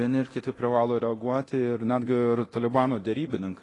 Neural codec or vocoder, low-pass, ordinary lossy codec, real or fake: codec, 24 kHz, 0.9 kbps, DualCodec; 10.8 kHz; AAC, 32 kbps; fake